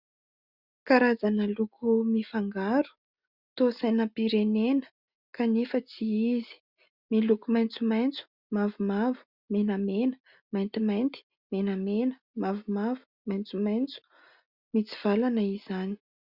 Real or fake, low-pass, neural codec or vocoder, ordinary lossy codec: real; 5.4 kHz; none; Opus, 64 kbps